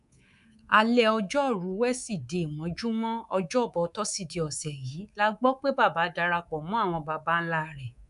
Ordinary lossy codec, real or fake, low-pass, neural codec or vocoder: none; fake; 10.8 kHz; codec, 24 kHz, 3.1 kbps, DualCodec